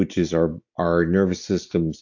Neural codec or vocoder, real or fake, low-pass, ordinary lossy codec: none; real; 7.2 kHz; AAC, 48 kbps